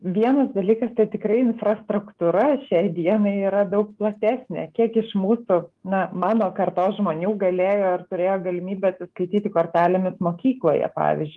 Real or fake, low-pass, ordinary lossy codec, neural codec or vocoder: fake; 10.8 kHz; Opus, 24 kbps; autoencoder, 48 kHz, 128 numbers a frame, DAC-VAE, trained on Japanese speech